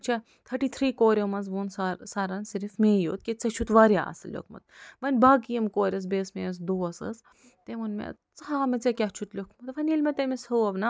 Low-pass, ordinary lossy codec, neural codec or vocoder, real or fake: none; none; none; real